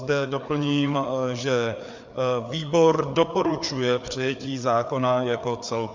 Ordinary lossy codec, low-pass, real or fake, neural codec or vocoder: MP3, 64 kbps; 7.2 kHz; fake; codec, 16 kHz, 4 kbps, FreqCodec, larger model